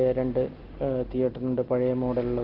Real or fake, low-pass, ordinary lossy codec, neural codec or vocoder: real; 5.4 kHz; Opus, 16 kbps; none